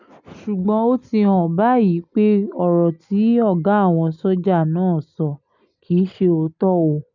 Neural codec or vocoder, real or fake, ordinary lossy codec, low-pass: none; real; none; 7.2 kHz